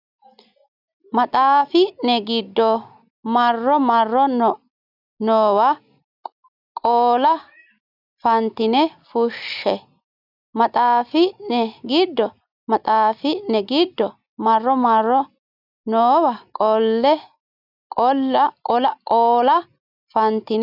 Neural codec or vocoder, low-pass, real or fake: none; 5.4 kHz; real